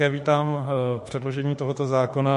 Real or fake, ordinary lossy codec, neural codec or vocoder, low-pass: fake; MP3, 48 kbps; autoencoder, 48 kHz, 32 numbers a frame, DAC-VAE, trained on Japanese speech; 14.4 kHz